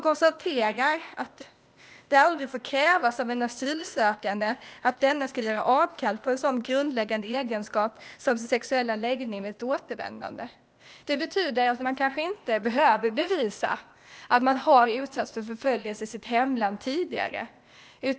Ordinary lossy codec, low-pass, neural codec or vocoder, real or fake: none; none; codec, 16 kHz, 0.8 kbps, ZipCodec; fake